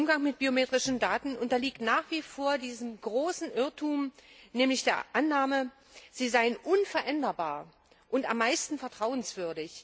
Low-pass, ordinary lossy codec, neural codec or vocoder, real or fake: none; none; none; real